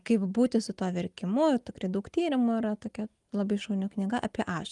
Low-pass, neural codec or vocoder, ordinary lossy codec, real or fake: 10.8 kHz; none; Opus, 32 kbps; real